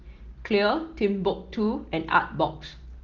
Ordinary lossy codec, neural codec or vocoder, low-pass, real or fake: Opus, 16 kbps; none; 7.2 kHz; real